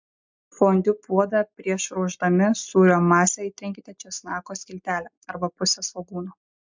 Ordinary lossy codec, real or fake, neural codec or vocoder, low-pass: MP3, 64 kbps; real; none; 7.2 kHz